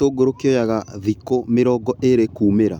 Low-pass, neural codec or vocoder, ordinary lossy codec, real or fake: 19.8 kHz; none; none; real